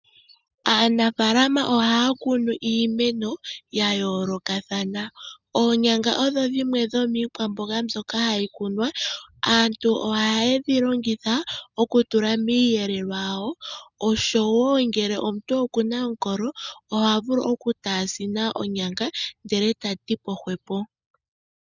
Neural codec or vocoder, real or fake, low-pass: none; real; 7.2 kHz